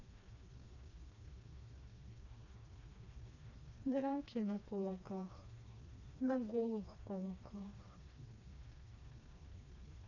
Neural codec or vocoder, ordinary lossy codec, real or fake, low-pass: codec, 16 kHz, 2 kbps, FreqCodec, smaller model; none; fake; 7.2 kHz